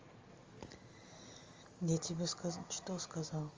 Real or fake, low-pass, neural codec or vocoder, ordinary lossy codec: real; 7.2 kHz; none; Opus, 32 kbps